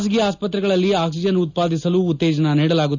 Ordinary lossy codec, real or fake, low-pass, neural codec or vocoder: none; real; 7.2 kHz; none